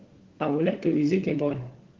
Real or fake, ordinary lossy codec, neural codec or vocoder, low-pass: fake; Opus, 16 kbps; codec, 16 kHz, 4 kbps, FunCodec, trained on LibriTTS, 50 frames a second; 7.2 kHz